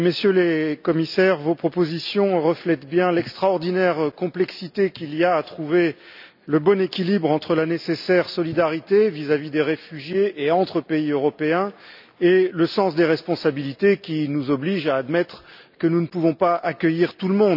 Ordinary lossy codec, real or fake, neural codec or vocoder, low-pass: none; real; none; 5.4 kHz